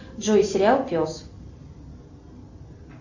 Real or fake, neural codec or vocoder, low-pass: real; none; 7.2 kHz